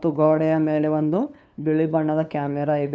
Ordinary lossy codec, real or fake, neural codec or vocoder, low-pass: none; fake; codec, 16 kHz, 2 kbps, FunCodec, trained on LibriTTS, 25 frames a second; none